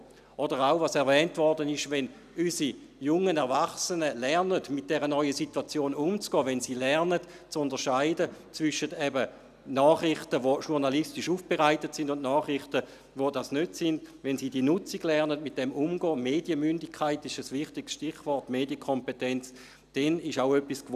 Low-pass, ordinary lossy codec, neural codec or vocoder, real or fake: 14.4 kHz; none; none; real